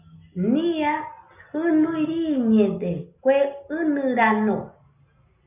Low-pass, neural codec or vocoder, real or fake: 3.6 kHz; none; real